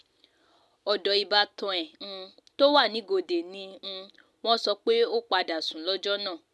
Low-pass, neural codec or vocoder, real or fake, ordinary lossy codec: none; none; real; none